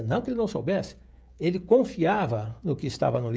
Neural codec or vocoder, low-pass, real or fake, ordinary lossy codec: codec, 16 kHz, 16 kbps, FreqCodec, smaller model; none; fake; none